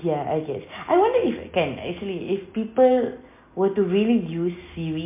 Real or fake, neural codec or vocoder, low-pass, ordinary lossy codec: real; none; 3.6 kHz; MP3, 24 kbps